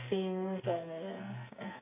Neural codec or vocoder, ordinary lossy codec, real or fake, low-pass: codec, 44.1 kHz, 2.6 kbps, SNAC; AAC, 24 kbps; fake; 3.6 kHz